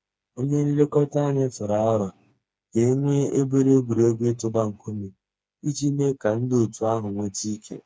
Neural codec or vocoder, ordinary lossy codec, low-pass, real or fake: codec, 16 kHz, 4 kbps, FreqCodec, smaller model; none; none; fake